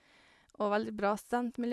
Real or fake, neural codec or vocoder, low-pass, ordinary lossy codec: real; none; none; none